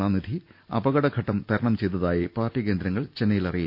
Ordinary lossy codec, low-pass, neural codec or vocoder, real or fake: none; 5.4 kHz; none; real